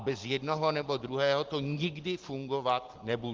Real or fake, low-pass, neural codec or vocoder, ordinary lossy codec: real; 7.2 kHz; none; Opus, 16 kbps